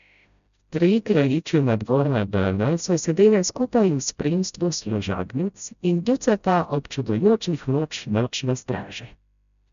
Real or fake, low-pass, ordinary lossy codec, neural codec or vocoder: fake; 7.2 kHz; none; codec, 16 kHz, 0.5 kbps, FreqCodec, smaller model